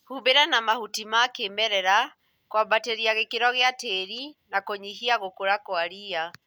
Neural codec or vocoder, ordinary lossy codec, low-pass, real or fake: none; none; none; real